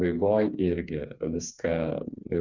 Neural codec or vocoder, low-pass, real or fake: codec, 16 kHz, 4 kbps, FreqCodec, smaller model; 7.2 kHz; fake